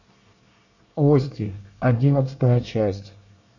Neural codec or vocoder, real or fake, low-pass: codec, 24 kHz, 1 kbps, SNAC; fake; 7.2 kHz